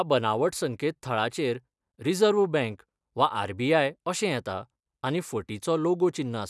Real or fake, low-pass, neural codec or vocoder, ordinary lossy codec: real; none; none; none